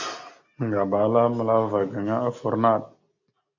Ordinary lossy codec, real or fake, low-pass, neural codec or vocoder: MP3, 48 kbps; real; 7.2 kHz; none